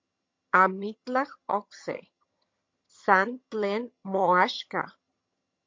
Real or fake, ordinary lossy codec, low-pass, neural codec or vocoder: fake; MP3, 48 kbps; 7.2 kHz; vocoder, 22.05 kHz, 80 mel bands, HiFi-GAN